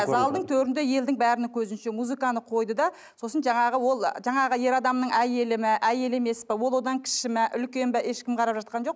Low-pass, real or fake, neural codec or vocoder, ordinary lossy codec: none; real; none; none